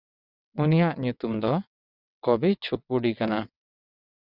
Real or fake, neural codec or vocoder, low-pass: fake; vocoder, 22.05 kHz, 80 mel bands, WaveNeXt; 5.4 kHz